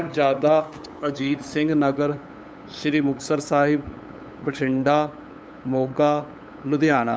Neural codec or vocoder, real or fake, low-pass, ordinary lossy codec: codec, 16 kHz, 8 kbps, FunCodec, trained on LibriTTS, 25 frames a second; fake; none; none